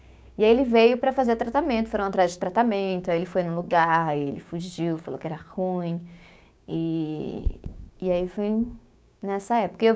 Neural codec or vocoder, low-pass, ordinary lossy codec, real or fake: codec, 16 kHz, 6 kbps, DAC; none; none; fake